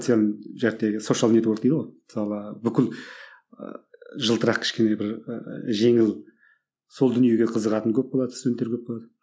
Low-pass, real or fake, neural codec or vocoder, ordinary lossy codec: none; real; none; none